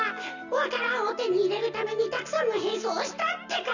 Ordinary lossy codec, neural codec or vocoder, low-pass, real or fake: none; none; 7.2 kHz; real